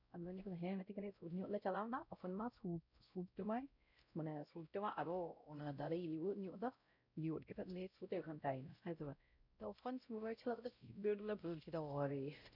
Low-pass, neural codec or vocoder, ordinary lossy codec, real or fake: 5.4 kHz; codec, 16 kHz, 0.5 kbps, X-Codec, WavLM features, trained on Multilingual LibriSpeech; none; fake